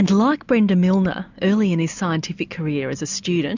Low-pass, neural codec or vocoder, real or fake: 7.2 kHz; none; real